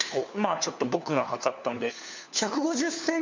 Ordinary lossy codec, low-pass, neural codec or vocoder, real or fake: MP3, 64 kbps; 7.2 kHz; codec, 16 kHz, 4 kbps, FunCodec, trained on LibriTTS, 50 frames a second; fake